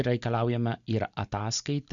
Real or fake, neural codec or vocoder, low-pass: real; none; 7.2 kHz